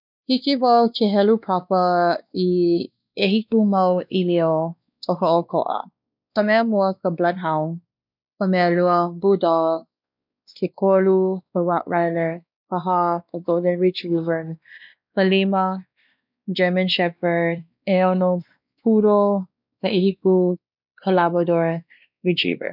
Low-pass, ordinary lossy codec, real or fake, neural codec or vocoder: 5.4 kHz; none; fake; codec, 16 kHz, 2 kbps, X-Codec, WavLM features, trained on Multilingual LibriSpeech